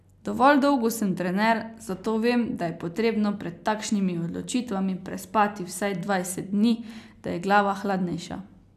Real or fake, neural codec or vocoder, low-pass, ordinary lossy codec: real; none; 14.4 kHz; none